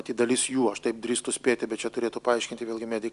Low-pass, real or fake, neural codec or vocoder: 10.8 kHz; real; none